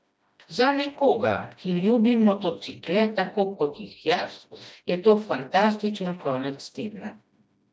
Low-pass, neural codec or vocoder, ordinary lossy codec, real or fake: none; codec, 16 kHz, 1 kbps, FreqCodec, smaller model; none; fake